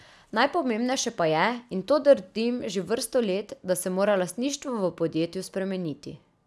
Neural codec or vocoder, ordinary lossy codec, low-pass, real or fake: none; none; none; real